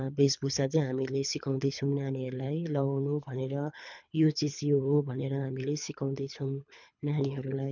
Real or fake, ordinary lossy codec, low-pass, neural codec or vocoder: fake; none; 7.2 kHz; codec, 24 kHz, 3 kbps, HILCodec